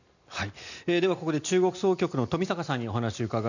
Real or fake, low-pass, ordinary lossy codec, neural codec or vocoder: real; 7.2 kHz; none; none